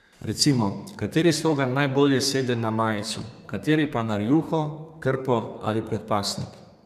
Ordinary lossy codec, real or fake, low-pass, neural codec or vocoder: none; fake; 14.4 kHz; codec, 32 kHz, 1.9 kbps, SNAC